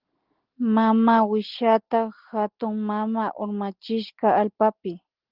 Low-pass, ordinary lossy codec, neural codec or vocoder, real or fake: 5.4 kHz; Opus, 16 kbps; none; real